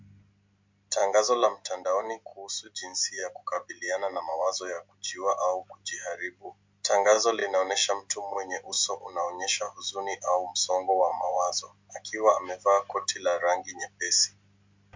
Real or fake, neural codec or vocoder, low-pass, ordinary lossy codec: real; none; 7.2 kHz; MP3, 64 kbps